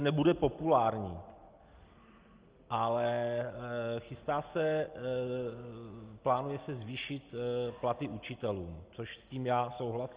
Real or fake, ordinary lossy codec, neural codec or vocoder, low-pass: real; Opus, 32 kbps; none; 3.6 kHz